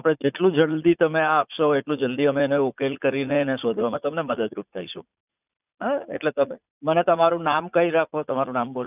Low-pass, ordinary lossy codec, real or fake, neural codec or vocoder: 3.6 kHz; none; fake; vocoder, 44.1 kHz, 80 mel bands, Vocos